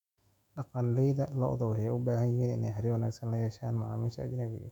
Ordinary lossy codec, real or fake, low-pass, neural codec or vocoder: none; fake; 19.8 kHz; autoencoder, 48 kHz, 128 numbers a frame, DAC-VAE, trained on Japanese speech